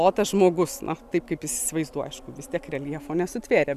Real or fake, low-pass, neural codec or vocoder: real; 14.4 kHz; none